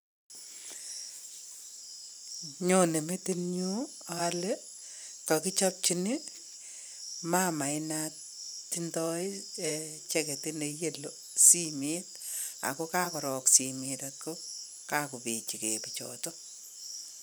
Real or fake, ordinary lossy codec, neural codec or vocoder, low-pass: fake; none; vocoder, 44.1 kHz, 128 mel bands every 512 samples, BigVGAN v2; none